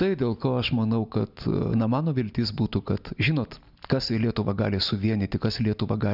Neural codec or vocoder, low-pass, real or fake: none; 5.4 kHz; real